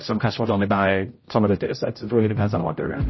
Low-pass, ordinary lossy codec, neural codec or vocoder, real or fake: 7.2 kHz; MP3, 24 kbps; codec, 16 kHz, 0.5 kbps, X-Codec, HuBERT features, trained on general audio; fake